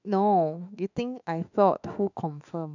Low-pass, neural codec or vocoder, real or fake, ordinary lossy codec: 7.2 kHz; autoencoder, 48 kHz, 32 numbers a frame, DAC-VAE, trained on Japanese speech; fake; none